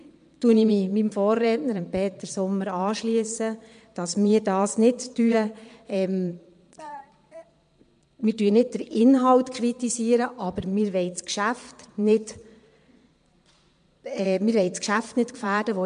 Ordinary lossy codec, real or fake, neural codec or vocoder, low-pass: MP3, 64 kbps; fake; vocoder, 22.05 kHz, 80 mel bands, Vocos; 9.9 kHz